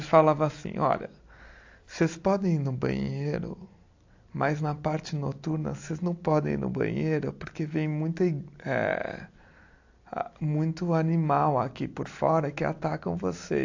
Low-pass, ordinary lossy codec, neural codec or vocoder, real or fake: 7.2 kHz; AAC, 48 kbps; none; real